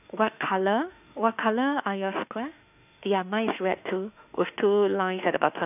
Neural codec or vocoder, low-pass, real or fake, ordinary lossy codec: autoencoder, 48 kHz, 32 numbers a frame, DAC-VAE, trained on Japanese speech; 3.6 kHz; fake; none